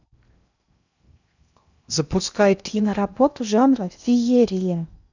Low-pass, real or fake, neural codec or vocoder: 7.2 kHz; fake; codec, 16 kHz in and 24 kHz out, 0.6 kbps, FocalCodec, streaming, 2048 codes